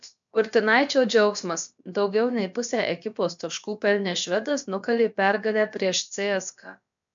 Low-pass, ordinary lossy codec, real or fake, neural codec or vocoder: 7.2 kHz; MP3, 64 kbps; fake; codec, 16 kHz, about 1 kbps, DyCAST, with the encoder's durations